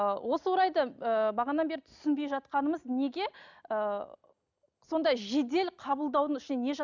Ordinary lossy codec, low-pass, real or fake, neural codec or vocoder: none; 7.2 kHz; real; none